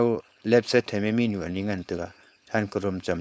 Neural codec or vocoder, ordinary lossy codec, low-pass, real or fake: codec, 16 kHz, 4.8 kbps, FACodec; none; none; fake